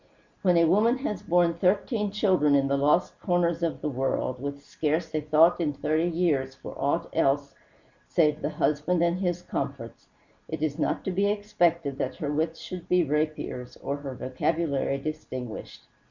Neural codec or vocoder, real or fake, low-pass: none; real; 7.2 kHz